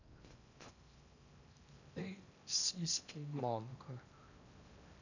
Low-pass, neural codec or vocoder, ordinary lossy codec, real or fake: 7.2 kHz; codec, 16 kHz in and 24 kHz out, 0.6 kbps, FocalCodec, streaming, 4096 codes; none; fake